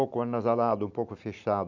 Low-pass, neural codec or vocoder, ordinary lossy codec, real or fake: 7.2 kHz; none; none; real